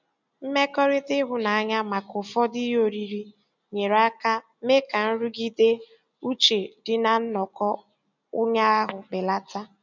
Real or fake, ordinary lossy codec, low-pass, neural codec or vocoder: real; none; 7.2 kHz; none